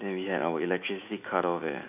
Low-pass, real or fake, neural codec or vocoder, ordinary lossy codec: 3.6 kHz; fake; autoencoder, 48 kHz, 128 numbers a frame, DAC-VAE, trained on Japanese speech; none